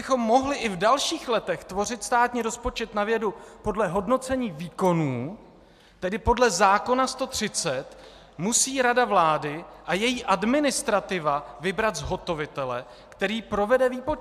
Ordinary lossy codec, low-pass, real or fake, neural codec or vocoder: AAC, 96 kbps; 14.4 kHz; real; none